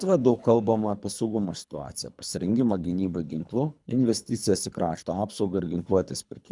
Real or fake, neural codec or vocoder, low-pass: fake; codec, 24 kHz, 3 kbps, HILCodec; 10.8 kHz